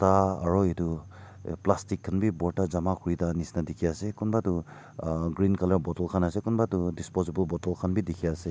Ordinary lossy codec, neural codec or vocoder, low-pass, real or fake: none; none; none; real